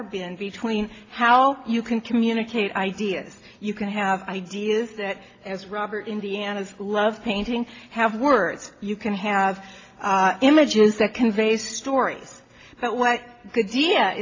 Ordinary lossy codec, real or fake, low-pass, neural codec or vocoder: AAC, 32 kbps; real; 7.2 kHz; none